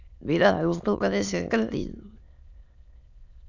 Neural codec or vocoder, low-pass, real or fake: autoencoder, 22.05 kHz, a latent of 192 numbers a frame, VITS, trained on many speakers; 7.2 kHz; fake